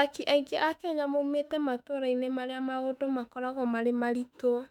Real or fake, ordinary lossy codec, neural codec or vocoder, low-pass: fake; none; autoencoder, 48 kHz, 32 numbers a frame, DAC-VAE, trained on Japanese speech; 19.8 kHz